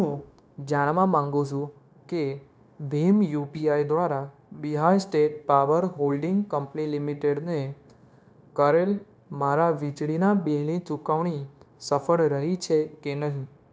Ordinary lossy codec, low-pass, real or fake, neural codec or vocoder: none; none; fake; codec, 16 kHz, 0.9 kbps, LongCat-Audio-Codec